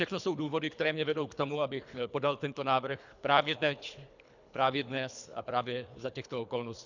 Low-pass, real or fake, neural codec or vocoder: 7.2 kHz; fake; codec, 24 kHz, 3 kbps, HILCodec